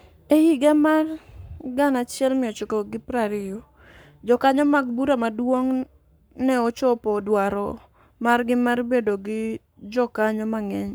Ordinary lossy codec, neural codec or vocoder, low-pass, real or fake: none; codec, 44.1 kHz, 7.8 kbps, Pupu-Codec; none; fake